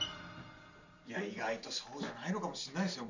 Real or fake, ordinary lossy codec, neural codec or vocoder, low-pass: real; none; none; 7.2 kHz